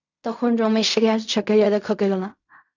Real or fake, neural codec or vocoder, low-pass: fake; codec, 16 kHz in and 24 kHz out, 0.4 kbps, LongCat-Audio-Codec, fine tuned four codebook decoder; 7.2 kHz